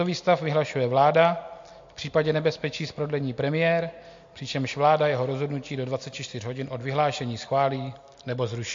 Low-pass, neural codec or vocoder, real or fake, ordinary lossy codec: 7.2 kHz; none; real; MP3, 48 kbps